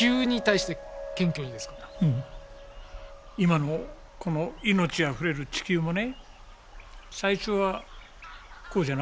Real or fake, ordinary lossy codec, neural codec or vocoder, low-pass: real; none; none; none